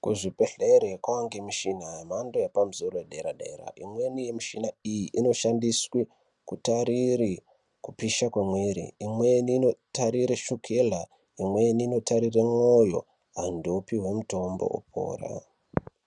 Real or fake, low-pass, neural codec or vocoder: real; 10.8 kHz; none